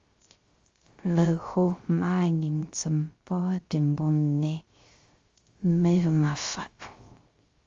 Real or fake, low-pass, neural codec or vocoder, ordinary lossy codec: fake; 7.2 kHz; codec, 16 kHz, 0.3 kbps, FocalCodec; Opus, 32 kbps